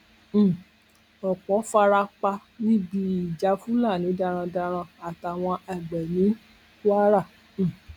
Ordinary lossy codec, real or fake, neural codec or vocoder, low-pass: none; real; none; 19.8 kHz